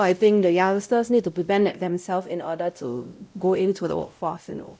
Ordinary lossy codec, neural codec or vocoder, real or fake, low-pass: none; codec, 16 kHz, 0.5 kbps, X-Codec, WavLM features, trained on Multilingual LibriSpeech; fake; none